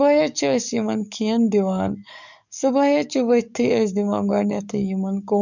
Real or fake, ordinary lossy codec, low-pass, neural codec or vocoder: fake; none; 7.2 kHz; codec, 44.1 kHz, 7.8 kbps, DAC